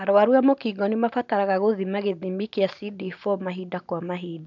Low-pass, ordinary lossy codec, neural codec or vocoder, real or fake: 7.2 kHz; none; none; real